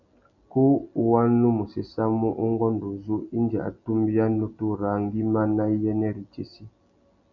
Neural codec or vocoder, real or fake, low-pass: none; real; 7.2 kHz